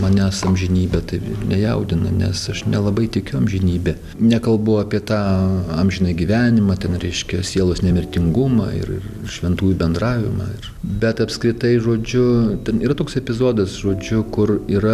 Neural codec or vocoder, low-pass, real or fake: none; 14.4 kHz; real